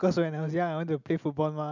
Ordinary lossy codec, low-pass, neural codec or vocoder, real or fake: none; 7.2 kHz; none; real